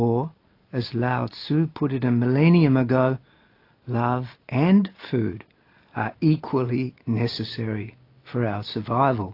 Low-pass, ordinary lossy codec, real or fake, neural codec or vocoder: 5.4 kHz; AAC, 32 kbps; real; none